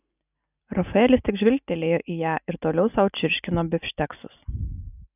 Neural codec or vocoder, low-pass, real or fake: none; 3.6 kHz; real